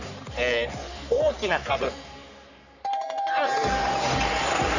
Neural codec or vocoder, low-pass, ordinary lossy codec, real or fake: codec, 44.1 kHz, 3.4 kbps, Pupu-Codec; 7.2 kHz; none; fake